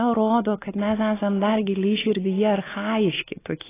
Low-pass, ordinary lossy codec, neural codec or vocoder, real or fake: 3.6 kHz; AAC, 16 kbps; none; real